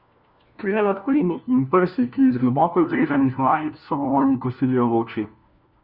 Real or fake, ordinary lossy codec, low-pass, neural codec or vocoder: fake; Opus, 64 kbps; 5.4 kHz; codec, 16 kHz, 1 kbps, FunCodec, trained on LibriTTS, 50 frames a second